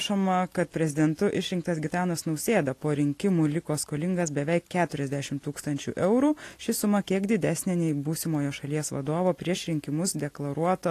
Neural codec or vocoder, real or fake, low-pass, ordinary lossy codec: none; real; 14.4 kHz; AAC, 48 kbps